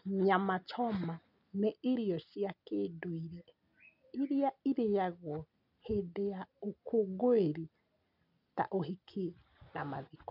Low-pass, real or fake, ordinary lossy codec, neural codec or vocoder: 5.4 kHz; real; none; none